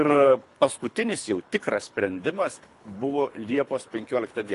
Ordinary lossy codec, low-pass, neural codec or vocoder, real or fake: AAC, 48 kbps; 10.8 kHz; codec, 24 kHz, 3 kbps, HILCodec; fake